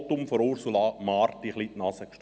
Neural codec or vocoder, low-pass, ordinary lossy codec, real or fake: none; none; none; real